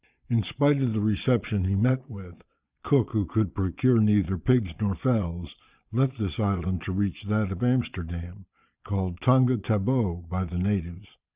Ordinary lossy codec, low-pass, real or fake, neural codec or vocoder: Opus, 64 kbps; 3.6 kHz; fake; vocoder, 22.05 kHz, 80 mel bands, Vocos